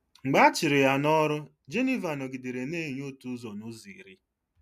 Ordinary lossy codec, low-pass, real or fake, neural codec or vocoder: MP3, 96 kbps; 14.4 kHz; real; none